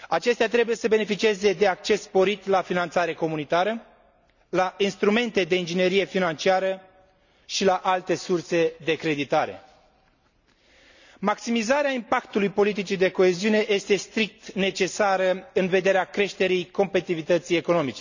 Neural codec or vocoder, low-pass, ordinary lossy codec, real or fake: none; 7.2 kHz; none; real